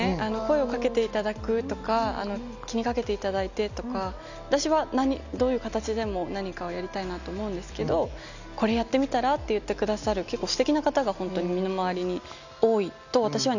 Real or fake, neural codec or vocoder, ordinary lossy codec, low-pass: real; none; none; 7.2 kHz